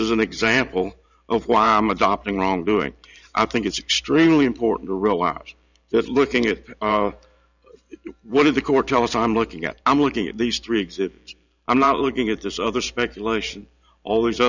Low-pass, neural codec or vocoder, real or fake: 7.2 kHz; vocoder, 44.1 kHz, 128 mel bands every 512 samples, BigVGAN v2; fake